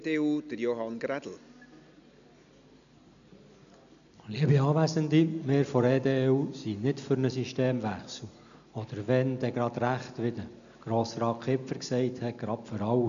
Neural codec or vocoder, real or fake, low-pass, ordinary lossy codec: none; real; 7.2 kHz; none